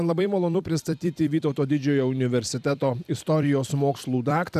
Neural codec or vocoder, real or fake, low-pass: vocoder, 44.1 kHz, 128 mel bands every 256 samples, BigVGAN v2; fake; 14.4 kHz